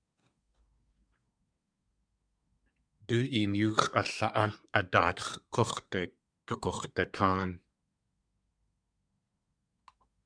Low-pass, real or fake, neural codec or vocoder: 9.9 kHz; fake; codec, 24 kHz, 1 kbps, SNAC